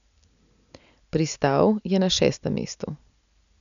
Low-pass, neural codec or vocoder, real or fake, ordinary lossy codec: 7.2 kHz; none; real; none